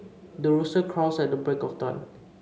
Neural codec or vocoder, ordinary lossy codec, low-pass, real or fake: none; none; none; real